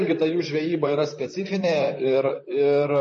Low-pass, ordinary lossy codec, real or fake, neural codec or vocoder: 10.8 kHz; MP3, 32 kbps; fake; vocoder, 44.1 kHz, 128 mel bands, Pupu-Vocoder